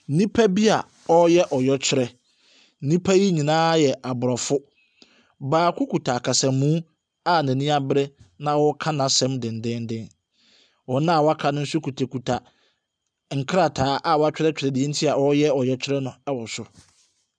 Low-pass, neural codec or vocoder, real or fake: 9.9 kHz; none; real